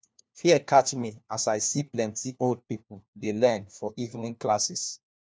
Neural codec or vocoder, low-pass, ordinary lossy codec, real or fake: codec, 16 kHz, 1 kbps, FunCodec, trained on LibriTTS, 50 frames a second; none; none; fake